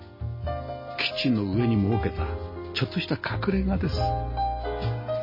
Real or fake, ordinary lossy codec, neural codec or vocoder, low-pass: real; MP3, 24 kbps; none; 5.4 kHz